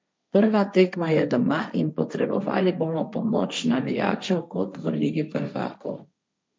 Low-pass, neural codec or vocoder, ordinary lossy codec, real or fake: none; codec, 16 kHz, 1.1 kbps, Voila-Tokenizer; none; fake